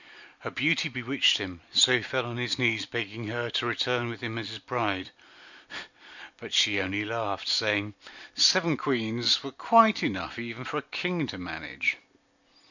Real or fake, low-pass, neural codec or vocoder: real; 7.2 kHz; none